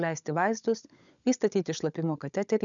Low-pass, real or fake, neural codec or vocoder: 7.2 kHz; fake; codec, 16 kHz, 16 kbps, FunCodec, trained on LibriTTS, 50 frames a second